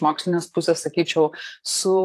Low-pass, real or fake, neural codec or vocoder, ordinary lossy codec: 14.4 kHz; fake; vocoder, 44.1 kHz, 128 mel bands, Pupu-Vocoder; AAC, 64 kbps